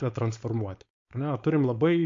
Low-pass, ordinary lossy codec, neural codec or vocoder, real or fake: 7.2 kHz; MP3, 48 kbps; codec, 16 kHz, 4.8 kbps, FACodec; fake